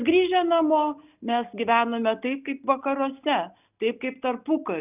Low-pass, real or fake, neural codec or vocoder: 3.6 kHz; real; none